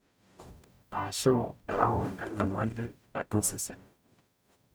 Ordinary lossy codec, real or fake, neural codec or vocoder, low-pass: none; fake; codec, 44.1 kHz, 0.9 kbps, DAC; none